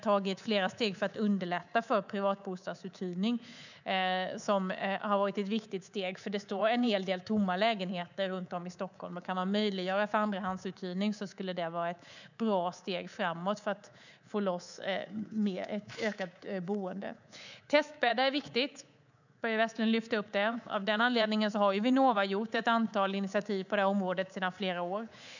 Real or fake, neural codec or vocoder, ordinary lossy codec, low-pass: fake; codec, 24 kHz, 3.1 kbps, DualCodec; none; 7.2 kHz